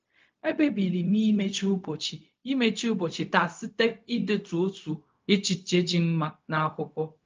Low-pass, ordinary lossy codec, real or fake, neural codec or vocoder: 7.2 kHz; Opus, 24 kbps; fake; codec, 16 kHz, 0.4 kbps, LongCat-Audio-Codec